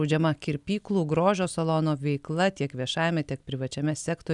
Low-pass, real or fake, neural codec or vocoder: 10.8 kHz; real; none